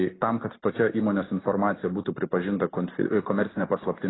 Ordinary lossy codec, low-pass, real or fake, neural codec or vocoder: AAC, 16 kbps; 7.2 kHz; real; none